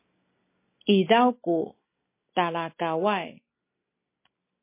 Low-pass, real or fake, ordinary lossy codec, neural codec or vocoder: 3.6 kHz; real; MP3, 24 kbps; none